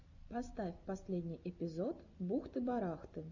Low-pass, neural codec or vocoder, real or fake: 7.2 kHz; none; real